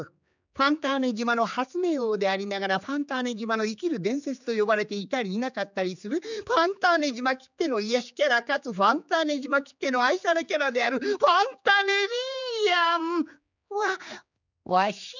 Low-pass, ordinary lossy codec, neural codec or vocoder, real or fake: 7.2 kHz; none; codec, 16 kHz, 4 kbps, X-Codec, HuBERT features, trained on general audio; fake